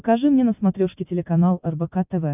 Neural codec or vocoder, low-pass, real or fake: none; 3.6 kHz; real